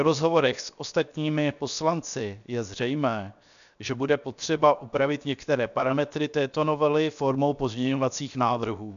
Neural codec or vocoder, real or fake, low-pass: codec, 16 kHz, 0.7 kbps, FocalCodec; fake; 7.2 kHz